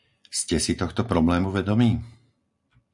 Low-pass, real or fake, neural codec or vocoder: 10.8 kHz; real; none